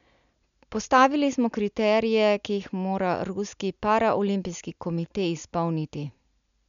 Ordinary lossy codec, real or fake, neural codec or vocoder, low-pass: none; real; none; 7.2 kHz